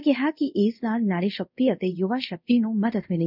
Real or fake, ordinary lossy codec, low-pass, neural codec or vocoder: fake; none; 5.4 kHz; codec, 24 kHz, 0.5 kbps, DualCodec